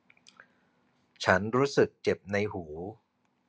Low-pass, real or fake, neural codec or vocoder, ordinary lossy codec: none; real; none; none